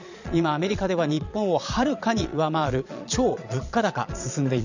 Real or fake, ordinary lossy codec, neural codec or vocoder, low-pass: fake; none; vocoder, 22.05 kHz, 80 mel bands, Vocos; 7.2 kHz